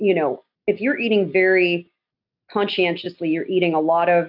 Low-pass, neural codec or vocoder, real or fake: 5.4 kHz; none; real